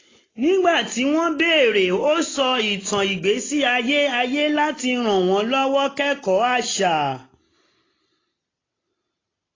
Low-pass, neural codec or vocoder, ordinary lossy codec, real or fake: 7.2 kHz; none; AAC, 32 kbps; real